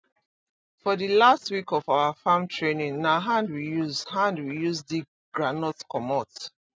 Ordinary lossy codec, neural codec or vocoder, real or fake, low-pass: none; none; real; none